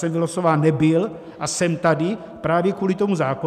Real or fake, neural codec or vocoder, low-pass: real; none; 14.4 kHz